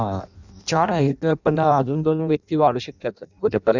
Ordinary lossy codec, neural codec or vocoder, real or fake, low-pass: none; codec, 16 kHz in and 24 kHz out, 0.6 kbps, FireRedTTS-2 codec; fake; 7.2 kHz